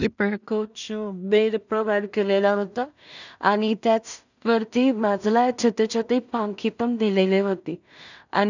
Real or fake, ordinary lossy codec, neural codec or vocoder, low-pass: fake; none; codec, 16 kHz in and 24 kHz out, 0.4 kbps, LongCat-Audio-Codec, two codebook decoder; 7.2 kHz